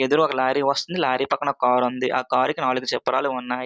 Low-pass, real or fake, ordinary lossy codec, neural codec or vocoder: none; real; none; none